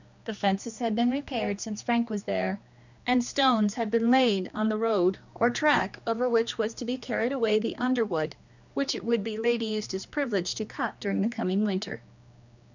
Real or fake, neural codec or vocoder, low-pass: fake; codec, 16 kHz, 2 kbps, X-Codec, HuBERT features, trained on general audio; 7.2 kHz